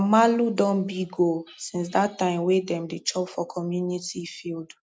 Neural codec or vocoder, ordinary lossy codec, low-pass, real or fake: none; none; none; real